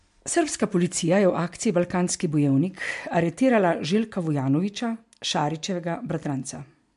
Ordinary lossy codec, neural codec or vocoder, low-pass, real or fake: MP3, 64 kbps; none; 10.8 kHz; real